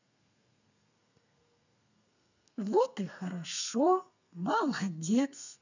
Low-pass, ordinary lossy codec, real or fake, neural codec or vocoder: 7.2 kHz; none; fake; codec, 32 kHz, 1.9 kbps, SNAC